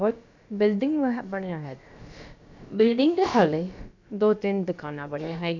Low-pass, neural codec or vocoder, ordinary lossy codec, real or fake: 7.2 kHz; codec, 16 kHz, about 1 kbps, DyCAST, with the encoder's durations; none; fake